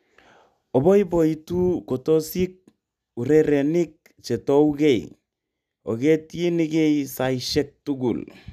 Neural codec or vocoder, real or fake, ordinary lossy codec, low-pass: none; real; none; 14.4 kHz